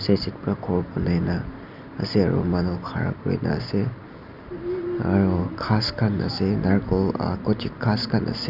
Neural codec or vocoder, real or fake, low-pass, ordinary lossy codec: none; real; 5.4 kHz; none